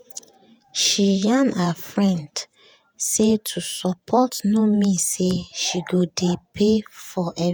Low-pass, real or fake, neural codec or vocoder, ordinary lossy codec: none; fake; vocoder, 48 kHz, 128 mel bands, Vocos; none